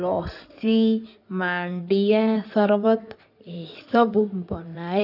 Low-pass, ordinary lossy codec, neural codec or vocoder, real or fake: 5.4 kHz; none; codec, 16 kHz in and 24 kHz out, 2.2 kbps, FireRedTTS-2 codec; fake